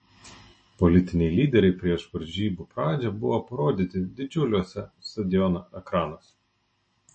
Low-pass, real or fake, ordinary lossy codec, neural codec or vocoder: 9.9 kHz; real; MP3, 32 kbps; none